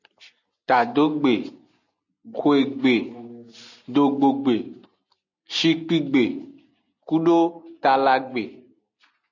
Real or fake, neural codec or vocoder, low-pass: real; none; 7.2 kHz